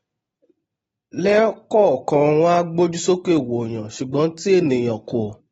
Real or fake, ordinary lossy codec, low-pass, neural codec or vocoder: real; AAC, 24 kbps; 19.8 kHz; none